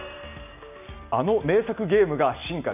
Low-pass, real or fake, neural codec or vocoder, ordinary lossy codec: 3.6 kHz; real; none; none